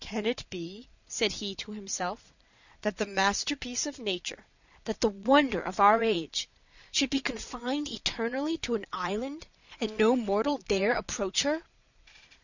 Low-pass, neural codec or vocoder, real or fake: 7.2 kHz; vocoder, 22.05 kHz, 80 mel bands, Vocos; fake